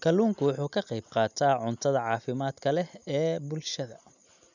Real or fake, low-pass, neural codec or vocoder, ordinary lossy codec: real; 7.2 kHz; none; none